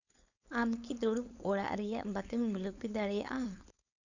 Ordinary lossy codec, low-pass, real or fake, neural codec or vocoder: none; 7.2 kHz; fake; codec, 16 kHz, 4.8 kbps, FACodec